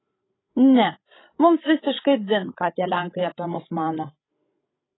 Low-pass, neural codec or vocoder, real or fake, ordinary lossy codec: 7.2 kHz; codec, 16 kHz, 16 kbps, FreqCodec, larger model; fake; AAC, 16 kbps